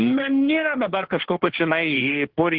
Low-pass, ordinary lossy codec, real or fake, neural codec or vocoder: 5.4 kHz; Opus, 16 kbps; fake; codec, 16 kHz, 1.1 kbps, Voila-Tokenizer